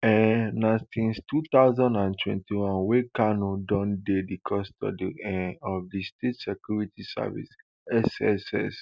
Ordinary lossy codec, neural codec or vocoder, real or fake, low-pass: none; none; real; none